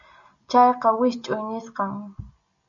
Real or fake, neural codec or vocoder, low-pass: real; none; 7.2 kHz